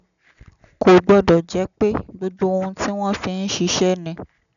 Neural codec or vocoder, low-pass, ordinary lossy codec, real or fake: none; 7.2 kHz; none; real